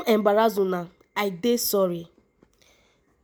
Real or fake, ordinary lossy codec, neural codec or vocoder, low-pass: real; none; none; none